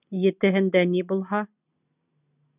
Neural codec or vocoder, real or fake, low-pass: none; real; 3.6 kHz